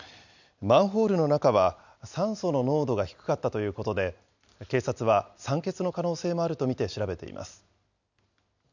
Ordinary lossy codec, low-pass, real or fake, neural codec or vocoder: none; 7.2 kHz; real; none